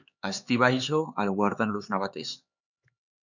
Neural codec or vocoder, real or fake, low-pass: codec, 16 kHz, 4 kbps, X-Codec, HuBERT features, trained on LibriSpeech; fake; 7.2 kHz